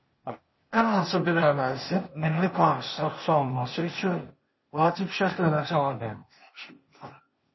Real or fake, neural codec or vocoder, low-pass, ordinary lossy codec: fake; codec, 16 kHz, 0.8 kbps, ZipCodec; 7.2 kHz; MP3, 24 kbps